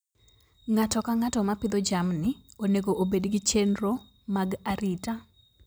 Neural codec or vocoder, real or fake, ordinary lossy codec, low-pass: none; real; none; none